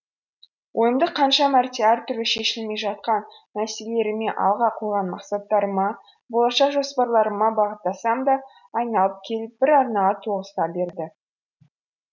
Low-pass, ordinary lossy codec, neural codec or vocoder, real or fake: 7.2 kHz; none; none; real